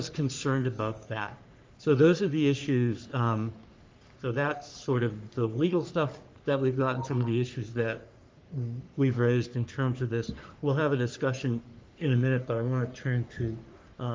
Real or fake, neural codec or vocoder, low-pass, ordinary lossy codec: fake; codec, 44.1 kHz, 3.4 kbps, Pupu-Codec; 7.2 kHz; Opus, 32 kbps